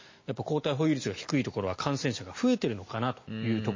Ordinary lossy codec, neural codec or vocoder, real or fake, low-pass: MP3, 32 kbps; none; real; 7.2 kHz